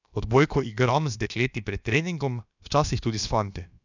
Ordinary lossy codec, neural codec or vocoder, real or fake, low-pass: none; codec, 16 kHz, about 1 kbps, DyCAST, with the encoder's durations; fake; 7.2 kHz